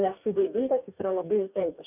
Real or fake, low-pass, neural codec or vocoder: fake; 3.6 kHz; codec, 44.1 kHz, 2.6 kbps, DAC